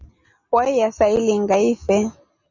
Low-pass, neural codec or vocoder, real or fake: 7.2 kHz; none; real